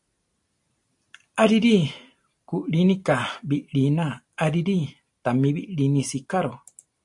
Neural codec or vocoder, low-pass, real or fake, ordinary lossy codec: none; 10.8 kHz; real; AAC, 64 kbps